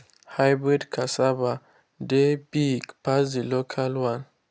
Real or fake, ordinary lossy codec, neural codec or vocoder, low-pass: real; none; none; none